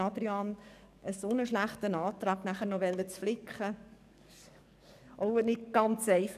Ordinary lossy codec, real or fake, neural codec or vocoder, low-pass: none; fake; codec, 44.1 kHz, 7.8 kbps, DAC; 14.4 kHz